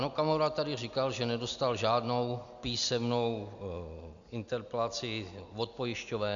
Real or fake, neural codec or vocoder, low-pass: real; none; 7.2 kHz